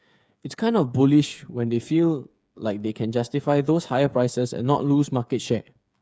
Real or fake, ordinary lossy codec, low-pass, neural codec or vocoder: fake; none; none; codec, 16 kHz, 8 kbps, FreqCodec, smaller model